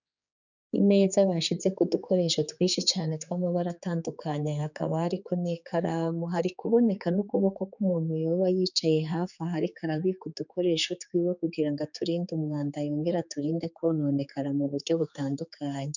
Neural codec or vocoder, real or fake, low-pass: codec, 16 kHz, 4 kbps, X-Codec, HuBERT features, trained on general audio; fake; 7.2 kHz